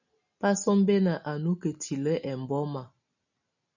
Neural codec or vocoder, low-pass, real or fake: none; 7.2 kHz; real